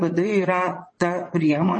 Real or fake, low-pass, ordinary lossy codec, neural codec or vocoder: fake; 9.9 kHz; MP3, 32 kbps; vocoder, 22.05 kHz, 80 mel bands, Vocos